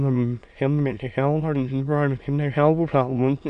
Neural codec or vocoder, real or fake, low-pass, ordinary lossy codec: autoencoder, 22.05 kHz, a latent of 192 numbers a frame, VITS, trained on many speakers; fake; 9.9 kHz; none